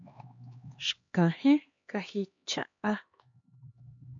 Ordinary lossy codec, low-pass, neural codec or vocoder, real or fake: AAC, 48 kbps; 7.2 kHz; codec, 16 kHz, 2 kbps, X-Codec, HuBERT features, trained on LibriSpeech; fake